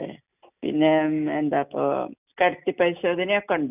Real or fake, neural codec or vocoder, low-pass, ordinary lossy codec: real; none; 3.6 kHz; none